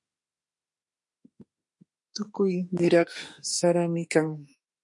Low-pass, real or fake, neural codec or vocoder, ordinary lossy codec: 10.8 kHz; fake; autoencoder, 48 kHz, 32 numbers a frame, DAC-VAE, trained on Japanese speech; MP3, 48 kbps